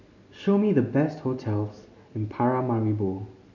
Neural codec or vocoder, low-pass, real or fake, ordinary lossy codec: none; 7.2 kHz; real; none